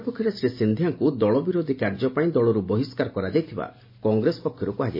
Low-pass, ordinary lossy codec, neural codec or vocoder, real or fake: 5.4 kHz; MP3, 24 kbps; none; real